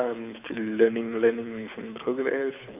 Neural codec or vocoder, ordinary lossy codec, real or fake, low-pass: codec, 24 kHz, 6 kbps, HILCodec; none; fake; 3.6 kHz